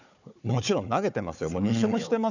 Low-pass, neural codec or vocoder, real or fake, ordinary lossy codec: 7.2 kHz; codec, 16 kHz, 4 kbps, FunCodec, trained on Chinese and English, 50 frames a second; fake; none